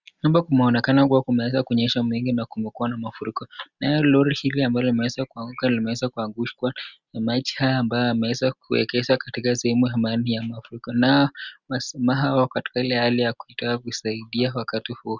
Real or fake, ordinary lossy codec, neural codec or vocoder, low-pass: real; Opus, 64 kbps; none; 7.2 kHz